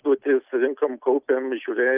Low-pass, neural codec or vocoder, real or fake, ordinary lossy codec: 3.6 kHz; none; real; Opus, 24 kbps